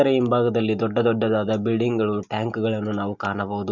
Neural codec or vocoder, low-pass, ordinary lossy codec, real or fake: none; 7.2 kHz; Opus, 64 kbps; real